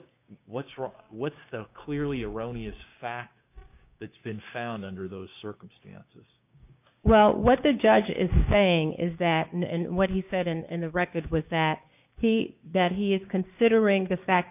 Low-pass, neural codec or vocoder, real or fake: 3.6 kHz; codec, 16 kHz, 6 kbps, DAC; fake